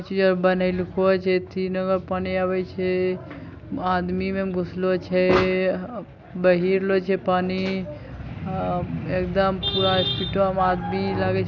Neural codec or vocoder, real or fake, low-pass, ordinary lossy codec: none; real; 7.2 kHz; none